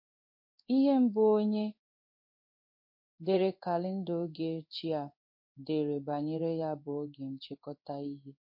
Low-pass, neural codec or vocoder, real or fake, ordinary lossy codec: 5.4 kHz; codec, 16 kHz in and 24 kHz out, 1 kbps, XY-Tokenizer; fake; MP3, 32 kbps